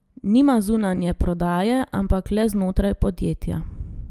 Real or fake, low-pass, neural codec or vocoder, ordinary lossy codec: real; 14.4 kHz; none; Opus, 32 kbps